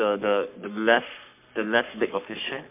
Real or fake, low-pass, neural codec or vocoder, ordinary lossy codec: fake; 3.6 kHz; codec, 44.1 kHz, 3.4 kbps, Pupu-Codec; MP3, 32 kbps